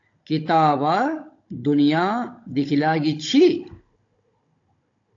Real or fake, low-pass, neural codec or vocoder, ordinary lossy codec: fake; 7.2 kHz; codec, 16 kHz, 16 kbps, FunCodec, trained on Chinese and English, 50 frames a second; AAC, 48 kbps